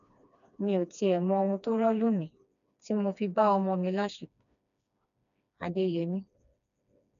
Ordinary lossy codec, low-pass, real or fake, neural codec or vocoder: none; 7.2 kHz; fake; codec, 16 kHz, 2 kbps, FreqCodec, smaller model